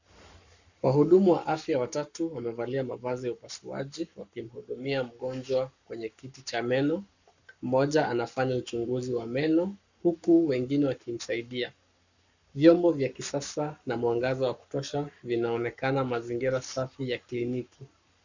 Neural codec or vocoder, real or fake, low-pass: codec, 44.1 kHz, 7.8 kbps, Pupu-Codec; fake; 7.2 kHz